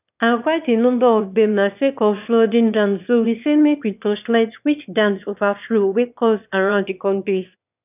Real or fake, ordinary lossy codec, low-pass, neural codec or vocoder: fake; none; 3.6 kHz; autoencoder, 22.05 kHz, a latent of 192 numbers a frame, VITS, trained on one speaker